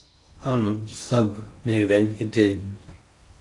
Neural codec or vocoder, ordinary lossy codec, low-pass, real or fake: codec, 16 kHz in and 24 kHz out, 0.6 kbps, FocalCodec, streaming, 4096 codes; AAC, 48 kbps; 10.8 kHz; fake